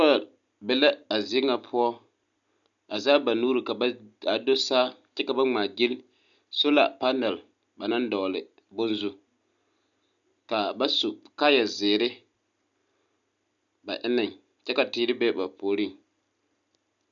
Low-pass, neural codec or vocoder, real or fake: 7.2 kHz; none; real